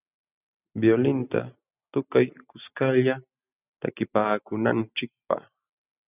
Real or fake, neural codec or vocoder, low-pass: real; none; 3.6 kHz